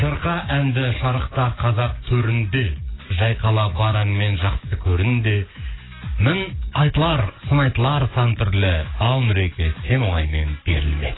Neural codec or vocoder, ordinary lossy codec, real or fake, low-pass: codec, 44.1 kHz, 7.8 kbps, DAC; AAC, 16 kbps; fake; 7.2 kHz